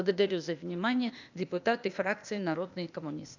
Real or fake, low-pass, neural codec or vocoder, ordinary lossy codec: fake; 7.2 kHz; codec, 16 kHz, 0.8 kbps, ZipCodec; none